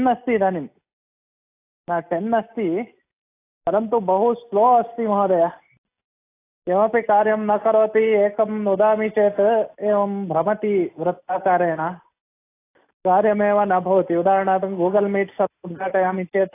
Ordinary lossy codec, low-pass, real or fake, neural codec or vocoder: AAC, 24 kbps; 3.6 kHz; real; none